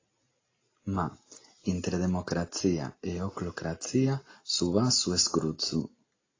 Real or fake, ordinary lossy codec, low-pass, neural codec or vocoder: real; AAC, 32 kbps; 7.2 kHz; none